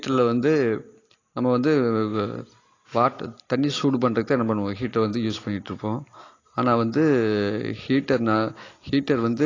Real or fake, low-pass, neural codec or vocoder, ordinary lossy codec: real; 7.2 kHz; none; AAC, 32 kbps